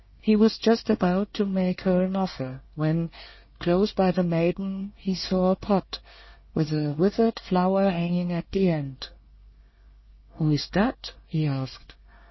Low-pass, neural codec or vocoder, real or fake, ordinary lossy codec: 7.2 kHz; codec, 24 kHz, 1 kbps, SNAC; fake; MP3, 24 kbps